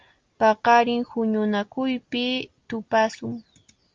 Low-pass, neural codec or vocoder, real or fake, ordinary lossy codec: 7.2 kHz; none; real; Opus, 24 kbps